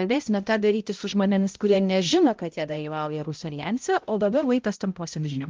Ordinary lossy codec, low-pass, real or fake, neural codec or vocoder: Opus, 32 kbps; 7.2 kHz; fake; codec, 16 kHz, 0.5 kbps, X-Codec, HuBERT features, trained on balanced general audio